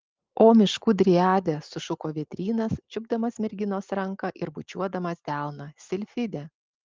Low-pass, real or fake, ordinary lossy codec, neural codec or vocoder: 7.2 kHz; real; Opus, 24 kbps; none